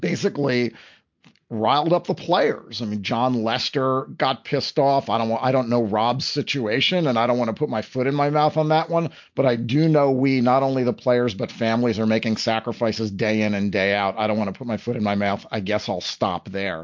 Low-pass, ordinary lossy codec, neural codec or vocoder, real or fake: 7.2 kHz; MP3, 48 kbps; none; real